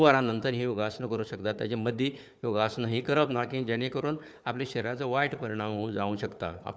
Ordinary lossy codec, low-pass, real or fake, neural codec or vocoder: none; none; fake; codec, 16 kHz, 4 kbps, FunCodec, trained on Chinese and English, 50 frames a second